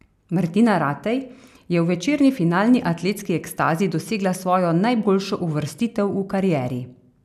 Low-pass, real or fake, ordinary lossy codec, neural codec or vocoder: 14.4 kHz; real; none; none